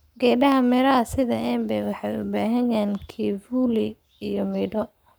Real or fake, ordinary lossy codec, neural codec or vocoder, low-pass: fake; none; codec, 44.1 kHz, 7.8 kbps, Pupu-Codec; none